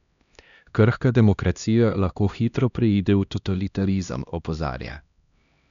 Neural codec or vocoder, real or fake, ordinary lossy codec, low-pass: codec, 16 kHz, 1 kbps, X-Codec, HuBERT features, trained on LibriSpeech; fake; none; 7.2 kHz